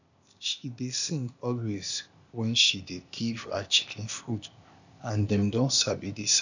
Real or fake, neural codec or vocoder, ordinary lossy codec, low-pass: fake; codec, 16 kHz, 0.8 kbps, ZipCodec; none; 7.2 kHz